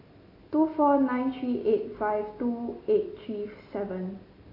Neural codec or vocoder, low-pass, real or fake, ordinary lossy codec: none; 5.4 kHz; real; AAC, 24 kbps